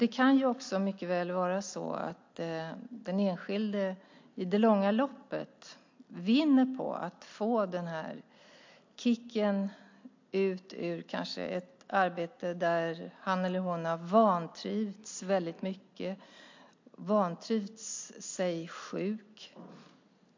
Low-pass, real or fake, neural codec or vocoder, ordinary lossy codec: 7.2 kHz; real; none; MP3, 48 kbps